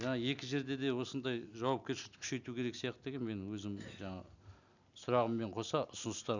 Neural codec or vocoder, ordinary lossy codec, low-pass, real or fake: none; none; 7.2 kHz; real